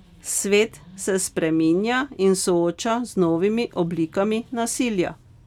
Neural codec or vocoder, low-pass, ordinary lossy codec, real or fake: none; 19.8 kHz; none; real